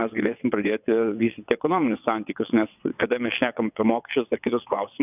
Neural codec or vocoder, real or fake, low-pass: vocoder, 22.05 kHz, 80 mel bands, WaveNeXt; fake; 3.6 kHz